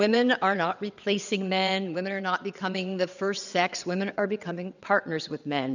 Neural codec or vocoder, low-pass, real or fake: vocoder, 22.05 kHz, 80 mel bands, WaveNeXt; 7.2 kHz; fake